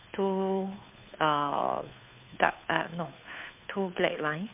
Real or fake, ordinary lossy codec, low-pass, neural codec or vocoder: fake; MP3, 32 kbps; 3.6 kHz; codec, 16 kHz, 2 kbps, FunCodec, trained on Chinese and English, 25 frames a second